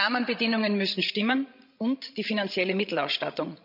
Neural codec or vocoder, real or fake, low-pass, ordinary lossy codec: codec, 16 kHz, 16 kbps, FreqCodec, larger model; fake; 5.4 kHz; none